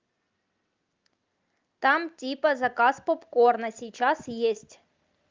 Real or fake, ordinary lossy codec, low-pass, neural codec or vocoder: real; Opus, 24 kbps; 7.2 kHz; none